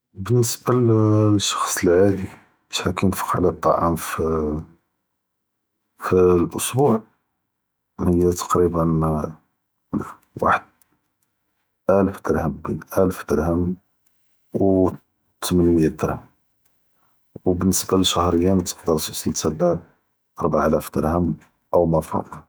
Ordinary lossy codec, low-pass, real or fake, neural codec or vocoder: none; none; fake; autoencoder, 48 kHz, 128 numbers a frame, DAC-VAE, trained on Japanese speech